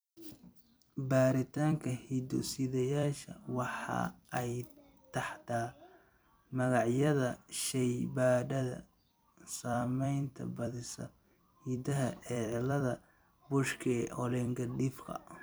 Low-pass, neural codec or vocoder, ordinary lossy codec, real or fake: none; vocoder, 44.1 kHz, 128 mel bands every 256 samples, BigVGAN v2; none; fake